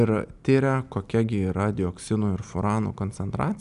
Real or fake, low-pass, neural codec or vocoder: real; 10.8 kHz; none